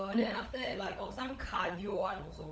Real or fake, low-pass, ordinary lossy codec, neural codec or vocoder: fake; none; none; codec, 16 kHz, 16 kbps, FunCodec, trained on LibriTTS, 50 frames a second